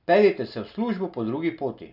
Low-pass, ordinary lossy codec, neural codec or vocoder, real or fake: 5.4 kHz; none; none; real